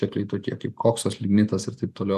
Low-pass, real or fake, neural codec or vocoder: 14.4 kHz; real; none